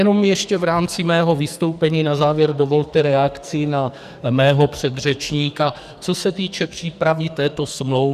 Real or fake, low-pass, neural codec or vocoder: fake; 14.4 kHz; codec, 44.1 kHz, 2.6 kbps, SNAC